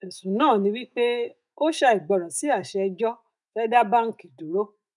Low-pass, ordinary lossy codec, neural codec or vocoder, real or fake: 10.8 kHz; none; autoencoder, 48 kHz, 128 numbers a frame, DAC-VAE, trained on Japanese speech; fake